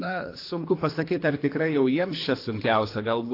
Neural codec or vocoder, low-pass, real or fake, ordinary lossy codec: codec, 24 kHz, 3 kbps, HILCodec; 5.4 kHz; fake; AAC, 32 kbps